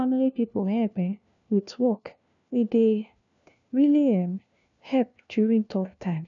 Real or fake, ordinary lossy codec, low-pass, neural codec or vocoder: fake; MP3, 96 kbps; 7.2 kHz; codec, 16 kHz, 1 kbps, FunCodec, trained on LibriTTS, 50 frames a second